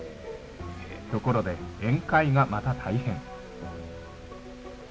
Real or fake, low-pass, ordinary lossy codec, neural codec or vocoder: real; none; none; none